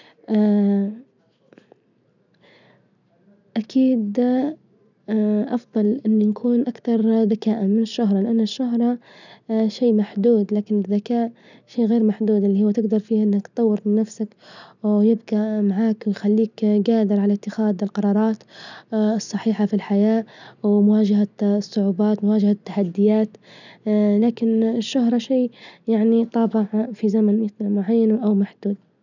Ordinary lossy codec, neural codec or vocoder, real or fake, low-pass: none; none; real; 7.2 kHz